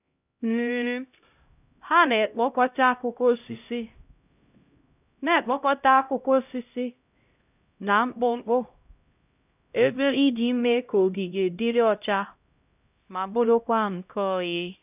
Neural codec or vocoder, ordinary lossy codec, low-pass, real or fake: codec, 16 kHz, 0.5 kbps, X-Codec, HuBERT features, trained on LibriSpeech; none; 3.6 kHz; fake